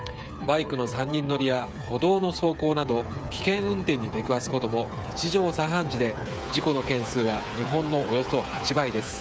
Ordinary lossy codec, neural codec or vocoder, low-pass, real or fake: none; codec, 16 kHz, 8 kbps, FreqCodec, smaller model; none; fake